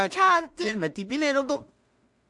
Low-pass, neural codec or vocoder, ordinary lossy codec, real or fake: 10.8 kHz; codec, 16 kHz in and 24 kHz out, 0.4 kbps, LongCat-Audio-Codec, two codebook decoder; MP3, 64 kbps; fake